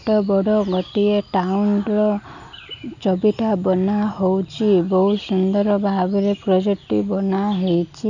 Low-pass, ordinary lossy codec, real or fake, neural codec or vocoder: 7.2 kHz; none; real; none